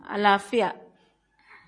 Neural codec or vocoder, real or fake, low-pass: none; real; 9.9 kHz